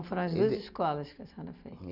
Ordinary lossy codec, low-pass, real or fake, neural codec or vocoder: none; 5.4 kHz; real; none